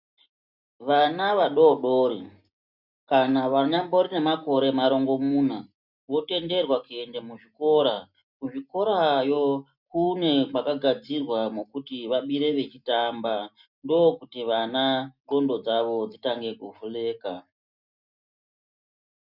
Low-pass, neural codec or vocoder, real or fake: 5.4 kHz; none; real